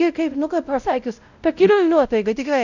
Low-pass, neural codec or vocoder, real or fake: 7.2 kHz; codec, 16 kHz, 0.5 kbps, X-Codec, WavLM features, trained on Multilingual LibriSpeech; fake